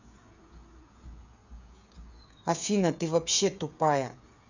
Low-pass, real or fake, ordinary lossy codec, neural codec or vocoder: 7.2 kHz; fake; none; codec, 16 kHz, 8 kbps, FreqCodec, smaller model